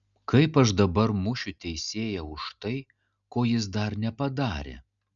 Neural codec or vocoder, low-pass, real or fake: none; 7.2 kHz; real